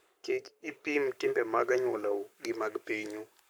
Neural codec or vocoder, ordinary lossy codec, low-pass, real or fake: codec, 44.1 kHz, 7.8 kbps, Pupu-Codec; none; none; fake